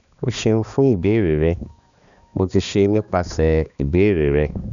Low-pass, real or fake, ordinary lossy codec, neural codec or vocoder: 7.2 kHz; fake; none; codec, 16 kHz, 2 kbps, X-Codec, HuBERT features, trained on balanced general audio